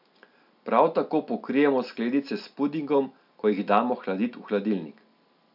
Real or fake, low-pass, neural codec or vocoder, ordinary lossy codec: real; 5.4 kHz; none; none